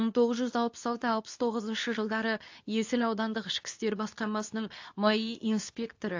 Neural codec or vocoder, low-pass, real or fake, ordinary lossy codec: codec, 24 kHz, 0.9 kbps, WavTokenizer, medium speech release version 2; 7.2 kHz; fake; AAC, 48 kbps